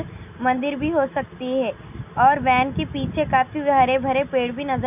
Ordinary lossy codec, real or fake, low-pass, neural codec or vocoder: none; real; 3.6 kHz; none